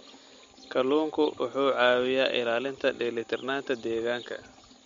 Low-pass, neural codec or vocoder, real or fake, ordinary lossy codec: 7.2 kHz; none; real; MP3, 48 kbps